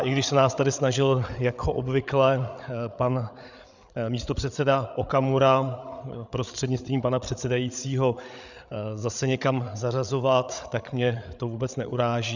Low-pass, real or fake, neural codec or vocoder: 7.2 kHz; fake; codec, 16 kHz, 8 kbps, FreqCodec, larger model